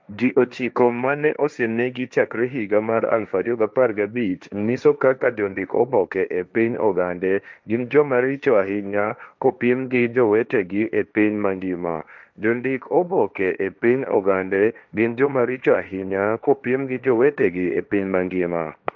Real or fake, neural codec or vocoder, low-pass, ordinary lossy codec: fake; codec, 16 kHz, 1.1 kbps, Voila-Tokenizer; none; none